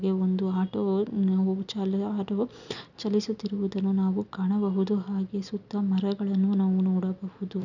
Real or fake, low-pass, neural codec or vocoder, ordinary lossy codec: real; 7.2 kHz; none; none